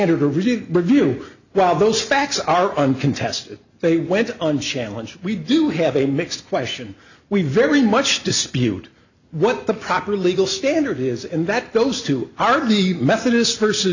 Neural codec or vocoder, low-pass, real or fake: none; 7.2 kHz; real